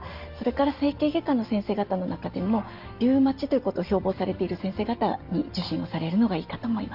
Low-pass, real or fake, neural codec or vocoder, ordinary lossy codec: 5.4 kHz; real; none; Opus, 24 kbps